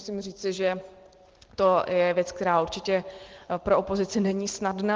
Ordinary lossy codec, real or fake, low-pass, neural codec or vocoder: Opus, 16 kbps; real; 7.2 kHz; none